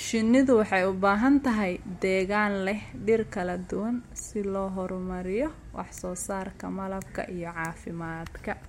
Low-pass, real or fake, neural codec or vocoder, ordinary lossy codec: 19.8 kHz; real; none; MP3, 64 kbps